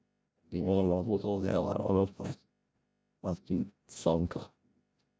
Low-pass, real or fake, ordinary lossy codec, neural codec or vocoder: none; fake; none; codec, 16 kHz, 0.5 kbps, FreqCodec, larger model